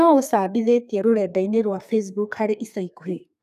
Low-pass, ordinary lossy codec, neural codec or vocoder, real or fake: 14.4 kHz; none; codec, 32 kHz, 1.9 kbps, SNAC; fake